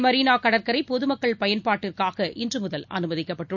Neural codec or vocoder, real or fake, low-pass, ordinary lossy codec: none; real; 7.2 kHz; none